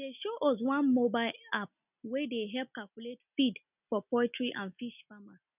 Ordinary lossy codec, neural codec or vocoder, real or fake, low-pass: none; none; real; 3.6 kHz